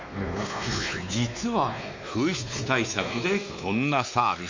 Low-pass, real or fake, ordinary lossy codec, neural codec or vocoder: 7.2 kHz; fake; MP3, 48 kbps; codec, 16 kHz, 2 kbps, X-Codec, WavLM features, trained on Multilingual LibriSpeech